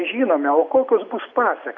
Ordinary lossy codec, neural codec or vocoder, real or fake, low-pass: MP3, 48 kbps; none; real; 7.2 kHz